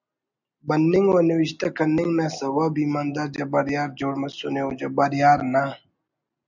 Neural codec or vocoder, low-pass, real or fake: none; 7.2 kHz; real